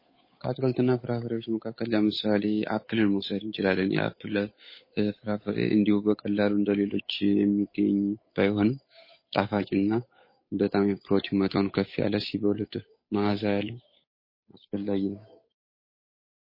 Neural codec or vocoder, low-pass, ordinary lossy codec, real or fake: codec, 16 kHz, 8 kbps, FunCodec, trained on Chinese and English, 25 frames a second; 5.4 kHz; MP3, 24 kbps; fake